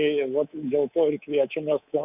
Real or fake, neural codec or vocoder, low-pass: fake; codec, 24 kHz, 3.1 kbps, DualCodec; 3.6 kHz